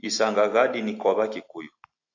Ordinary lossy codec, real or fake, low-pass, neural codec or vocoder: AAC, 48 kbps; real; 7.2 kHz; none